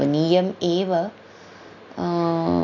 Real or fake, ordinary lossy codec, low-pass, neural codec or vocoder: real; none; 7.2 kHz; none